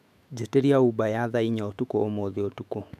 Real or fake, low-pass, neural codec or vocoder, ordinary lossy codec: fake; 14.4 kHz; autoencoder, 48 kHz, 128 numbers a frame, DAC-VAE, trained on Japanese speech; none